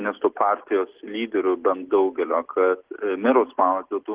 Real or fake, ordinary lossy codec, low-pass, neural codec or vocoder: real; Opus, 16 kbps; 3.6 kHz; none